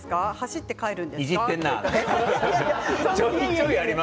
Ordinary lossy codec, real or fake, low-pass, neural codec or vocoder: none; real; none; none